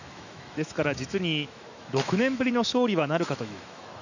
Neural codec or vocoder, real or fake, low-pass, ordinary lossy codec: autoencoder, 48 kHz, 128 numbers a frame, DAC-VAE, trained on Japanese speech; fake; 7.2 kHz; none